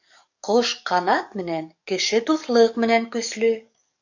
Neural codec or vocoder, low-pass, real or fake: codec, 44.1 kHz, 7.8 kbps, DAC; 7.2 kHz; fake